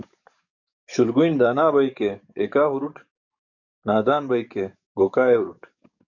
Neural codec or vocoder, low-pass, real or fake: codec, 44.1 kHz, 7.8 kbps, DAC; 7.2 kHz; fake